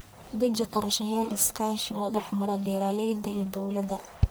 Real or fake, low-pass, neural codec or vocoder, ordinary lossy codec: fake; none; codec, 44.1 kHz, 1.7 kbps, Pupu-Codec; none